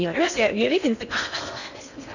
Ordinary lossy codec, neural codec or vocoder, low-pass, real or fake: none; codec, 16 kHz in and 24 kHz out, 0.6 kbps, FocalCodec, streaming, 4096 codes; 7.2 kHz; fake